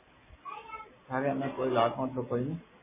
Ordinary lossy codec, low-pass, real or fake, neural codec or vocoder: MP3, 16 kbps; 3.6 kHz; real; none